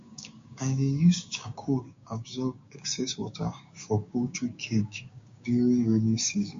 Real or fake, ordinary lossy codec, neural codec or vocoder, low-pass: fake; MP3, 48 kbps; codec, 16 kHz, 6 kbps, DAC; 7.2 kHz